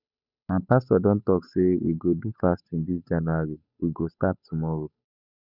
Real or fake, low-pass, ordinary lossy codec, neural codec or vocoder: fake; 5.4 kHz; none; codec, 16 kHz, 8 kbps, FunCodec, trained on Chinese and English, 25 frames a second